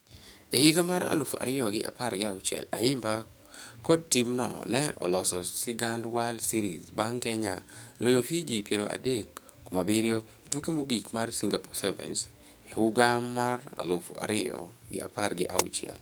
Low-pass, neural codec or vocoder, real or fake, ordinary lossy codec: none; codec, 44.1 kHz, 2.6 kbps, SNAC; fake; none